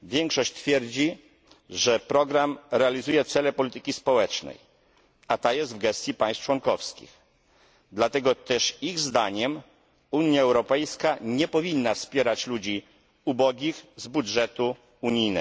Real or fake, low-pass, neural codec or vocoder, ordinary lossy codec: real; none; none; none